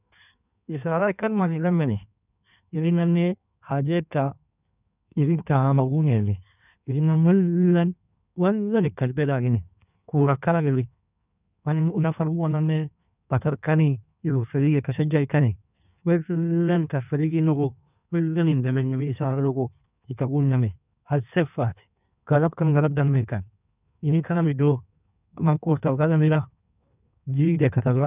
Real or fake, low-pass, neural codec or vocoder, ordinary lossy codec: fake; 3.6 kHz; codec, 16 kHz in and 24 kHz out, 1.1 kbps, FireRedTTS-2 codec; none